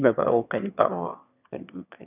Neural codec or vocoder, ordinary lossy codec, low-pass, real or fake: autoencoder, 22.05 kHz, a latent of 192 numbers a frame, VITS, trained on one speaker; none; 3.6 kHz; fake